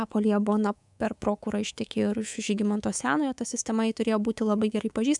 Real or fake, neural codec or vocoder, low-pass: fake; codec, 24 kHz, 3.1 kbps, DualCodec; 10.8 kHz